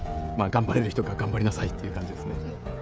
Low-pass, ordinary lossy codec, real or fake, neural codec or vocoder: none; none; fake; codec, 16 kHz, 16 kbps, FreqCodec, smaller model